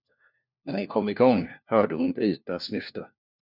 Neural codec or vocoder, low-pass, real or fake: codec, 16 kHz, 1 kbps, FunCodec, trained on LibriTTS, 50 frames a second; 5.4 kHz; fake